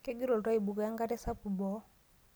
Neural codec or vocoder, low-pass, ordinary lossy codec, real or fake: none; none; none; real